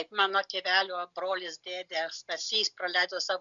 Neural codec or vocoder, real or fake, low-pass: none; real; 7.2 kHz